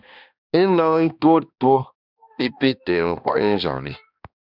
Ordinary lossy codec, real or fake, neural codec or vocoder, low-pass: Opus, 64 kbps; fake; codec, 16 kHz, 1 kbps, X-Codec, HuBERT features, trained on balanced general audio; 5.4 kHz